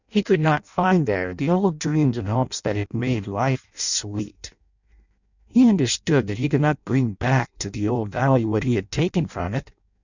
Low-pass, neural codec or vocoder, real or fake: 7.2 kHz; codec, 16 kHz in and 24 kHz out, 0.6 kbps, FireRedTTS-2 codec; fake